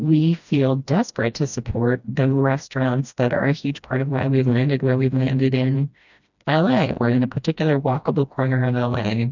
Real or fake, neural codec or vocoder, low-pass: fake; codec, 16 kHz, 1 kbps, FreqCodec, smaller model; 7.2 kHz